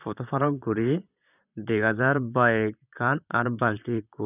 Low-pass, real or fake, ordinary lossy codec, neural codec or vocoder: 3.6 kHz; fake; none; vocoder, 44.1 kHz, 128 mel bands, Pupu-Vocoder